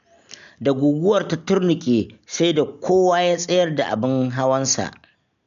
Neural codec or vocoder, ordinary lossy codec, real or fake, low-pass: none; none; real; 7.2 kHz